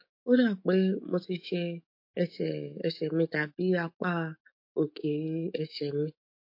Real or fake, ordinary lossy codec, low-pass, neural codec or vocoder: fake; MP3, 32 kbps; 5.4 kHz; autoencoder, 48 kHz, 128 numbers a frame, DAC-VAE, trained on Japanese speech